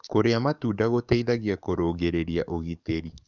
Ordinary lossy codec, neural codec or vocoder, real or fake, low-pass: none; codec, 44.1 kHz, 7.8 kbps, DAC; fake; 7.2 kHz